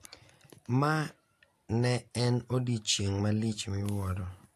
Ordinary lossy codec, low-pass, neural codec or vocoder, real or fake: AAC, 64 kbps; 14.4 kHz; none; real